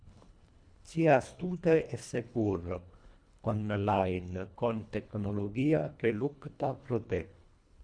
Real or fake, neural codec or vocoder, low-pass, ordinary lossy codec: fake; codec, 24 kHz, 1.5 kbps, HILCodec; 9.9 kHz; MP3, 96 kbps